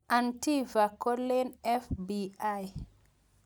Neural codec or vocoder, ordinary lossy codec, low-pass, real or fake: none; none; none; real